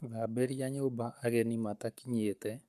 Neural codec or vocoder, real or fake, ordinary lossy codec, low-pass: codec, 44.1 kHz, 7.8 kbps, Pupu-Codec; fake; none; 14.4 kHz